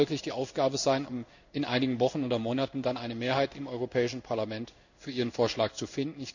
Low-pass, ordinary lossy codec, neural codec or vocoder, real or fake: 7.2 kHz; none; codec, 16 kHz in and 24 kHz out, 1 kbps, XY-Tokenizer; fake